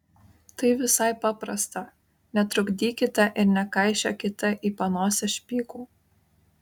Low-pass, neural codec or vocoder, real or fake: 19.8 kHz; none; real